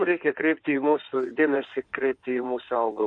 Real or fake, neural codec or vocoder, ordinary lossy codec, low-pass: fake; codec, 16 kHz in and 24 kHz out, 2.2 kbps, FireRedTTS-2 codec; AAC, 64 kbps; 9.9 kHz